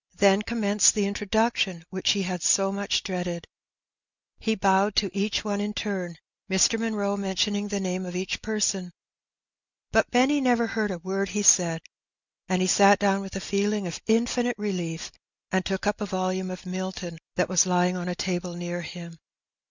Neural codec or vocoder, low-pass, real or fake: none; 7.2 kHz; real